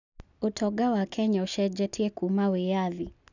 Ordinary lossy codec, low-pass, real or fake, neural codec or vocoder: none; 7.2 kHz; real; none